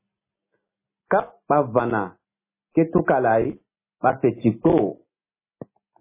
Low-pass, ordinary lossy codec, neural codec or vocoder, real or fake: 3.6 kHz; MP3, 16 kbps; none; real